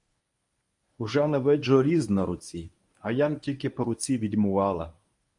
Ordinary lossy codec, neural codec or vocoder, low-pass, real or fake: MP3, 96 kbps; codec, 24 kHz, 0.9 kbps, WavTokenizer, medium speech release version 1; 10.8 kHz; fake